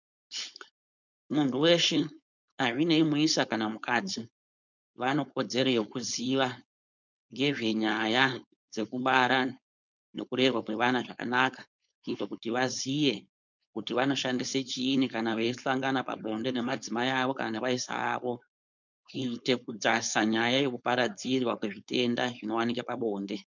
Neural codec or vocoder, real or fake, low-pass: codec, 16 kHz, 4.8 kbps, FACodec; fake; 7.2 kHz